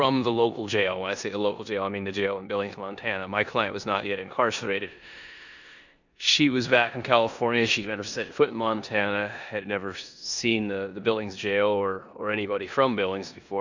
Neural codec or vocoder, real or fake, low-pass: codec, 16 kHz in and 24 kHz out, 0.9 kbps, LongCat-Audio-Codec, four codebook decoder; fake; 7.2 kHz